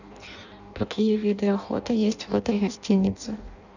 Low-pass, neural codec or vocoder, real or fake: 7.2 kHz; codec, 16 kHz in and 24 kHz out, 0.6 kbps, FireRedTTS-2 codec; fake